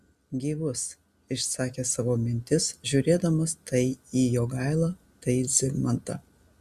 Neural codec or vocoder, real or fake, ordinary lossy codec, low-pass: none; real; Opus, 64 kbps; 14.4 kHz